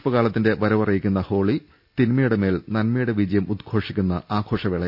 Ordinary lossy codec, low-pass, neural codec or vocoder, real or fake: AAC, 48 kbps; 5.4 kHz; none; real